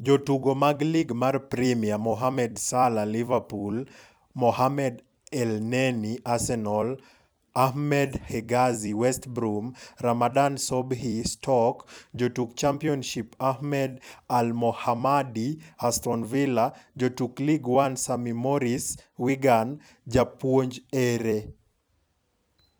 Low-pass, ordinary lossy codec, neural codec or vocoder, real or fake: none; none; vocoder, 44.1 kHz, 128 mel bands every 256 samples, BigVGAN v2; fake